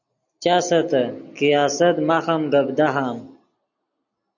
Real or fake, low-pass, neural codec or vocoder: real; 7.2 kHz; none